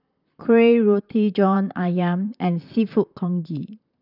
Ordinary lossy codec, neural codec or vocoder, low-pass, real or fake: none; vocoder, 44.1 kHz, 128 mel bands, Pupu-Vocoder; 5.4 kHz; fake